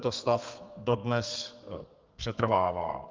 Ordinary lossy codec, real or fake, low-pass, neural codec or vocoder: Opus, 32 kbps; fake; 7.2 kHz; codec, 32 kHz, 1.9 kbps, SNAC